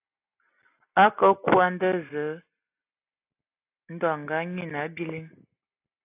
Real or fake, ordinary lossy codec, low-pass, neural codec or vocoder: real; AAC, 24 kbps; 3.6 kHz; none